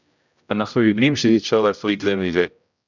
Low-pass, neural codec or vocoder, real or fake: 7.2 kHz; codec, 16 kHz, 0.5 kbps, X-Codec, HuBERT features, trained on general audio; fake